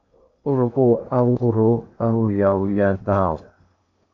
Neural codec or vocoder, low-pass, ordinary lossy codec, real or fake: codec, 16 kHz in and 24 kHz out, 0.8 kbps, FocalCodec, streaming, 65536 codes; 7.2 kHz; AAC, 48 kbps; fake